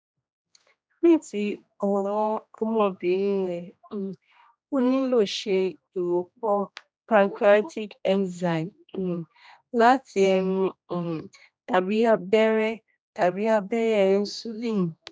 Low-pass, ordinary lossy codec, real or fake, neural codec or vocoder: none; none; fake; codec, 16 kHz, 1 kbps, X-Codec, HuBERT features, trained on general audio